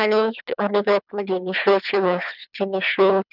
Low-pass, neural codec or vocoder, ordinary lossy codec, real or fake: 5.4 kHz; codec, 24 kHz, 3 kbps, HILCodec; none; fake